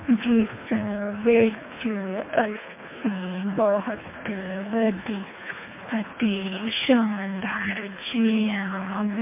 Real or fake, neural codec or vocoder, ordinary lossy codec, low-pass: fake; codec, 24 kHz, 1.5 kbps, HILCodec; MP3, 24 kbps; 3.6 kHz